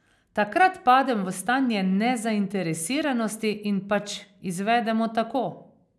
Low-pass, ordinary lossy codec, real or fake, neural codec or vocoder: none; none; real; none